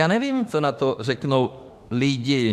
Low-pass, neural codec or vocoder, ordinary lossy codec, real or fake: 14.4 kHz; autoencoder, 48 kHz, 32 numbers a frame, DAC-VAE, trained on Japanese speech; AAC, 96 kbps; fake